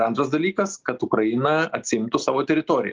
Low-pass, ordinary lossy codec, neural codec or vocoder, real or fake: 7.2 kHz; Opus, 24 kbps; none; real